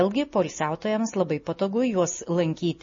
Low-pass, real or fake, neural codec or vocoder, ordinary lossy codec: 7.2 kHz; real; none; MP3, 32 kbps